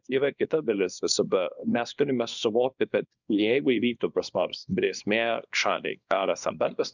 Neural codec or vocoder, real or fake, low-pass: codec, 24 kHz, 0.9 kbps, WavTokenizer, small release; fake; 7.2 kHz